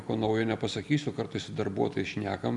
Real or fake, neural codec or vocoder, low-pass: real; none; 10.8 kHz